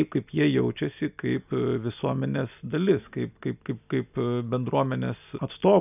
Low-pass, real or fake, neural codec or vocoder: 3.6 kHz; real; none